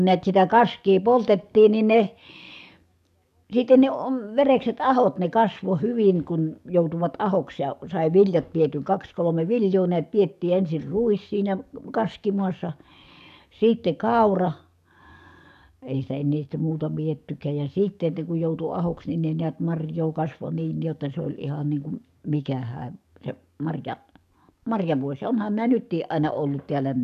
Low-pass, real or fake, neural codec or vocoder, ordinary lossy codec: 14.4 kHz; fake; codec, 44.1 kHz, 7.8 kbps, DAC; none